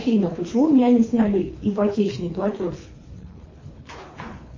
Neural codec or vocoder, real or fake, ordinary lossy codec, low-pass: codec, 24 kHz, 3 kbps, HILCodec; fake; MP3, 32 kbps; 7.2 kHz